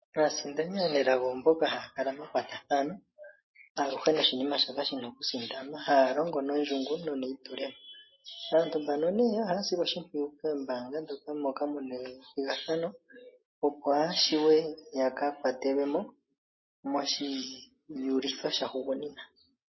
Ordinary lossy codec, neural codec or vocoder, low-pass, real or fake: MP3, 24 kbps; none; 7.2 kHz; real